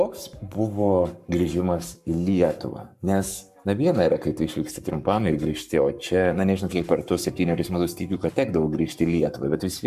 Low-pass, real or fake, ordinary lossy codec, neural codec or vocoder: 14.4 kHz; fake; AAC, 96 kbps; codec, 44.1 kHz, 7.8 kbps, Pupu-Codec